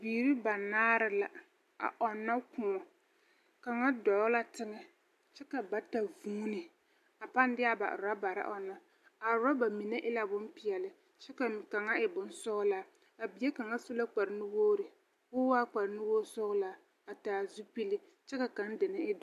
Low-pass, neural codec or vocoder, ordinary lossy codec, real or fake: 14.4 kHz; none; AAC, 96 kbps; real